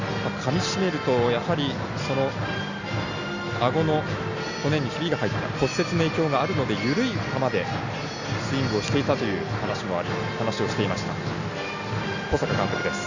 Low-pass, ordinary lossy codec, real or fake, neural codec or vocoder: 7.2 kHz; Opus, 64 kbps; real; none